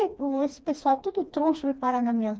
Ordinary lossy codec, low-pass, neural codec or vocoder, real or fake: none; none; codec, 16 kHz, 2 kbps, FreqCodec, smaller model; fake